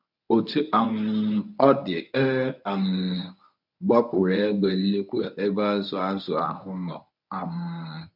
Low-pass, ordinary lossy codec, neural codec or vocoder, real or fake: 5.4 kHz; none; codec, 24 kHz, 0.9 kbps, WavTokenizer, medium speech release version 1; fake